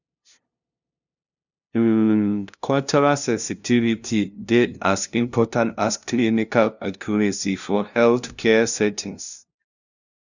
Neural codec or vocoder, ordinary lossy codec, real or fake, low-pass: codec, 16 kHz, 0.5 kbps, FunCodec, trained on LibriTTS, 25 frames a second; none; fake; 7.2 kHz